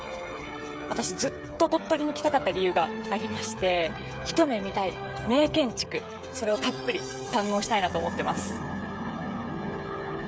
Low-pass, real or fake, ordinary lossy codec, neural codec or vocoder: none; fake; none; codec, 16 kHz, 8 kbps, FreqCodec, smaller model